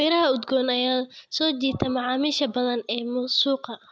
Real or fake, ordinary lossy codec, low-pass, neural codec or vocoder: real; none; none; none